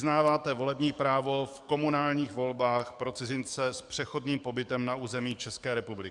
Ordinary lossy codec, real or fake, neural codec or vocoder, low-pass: Opus, 64 kbps; fake; codec, 44.1 kHz, 7.8 kbps, DAC; 10.8 kHz